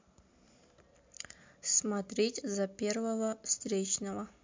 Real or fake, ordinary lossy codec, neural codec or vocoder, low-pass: real; MP3, 48 kbps; none; 7.2 kHz